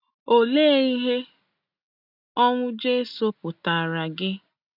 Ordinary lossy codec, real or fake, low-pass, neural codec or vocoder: none; real; 5.4 kHz; none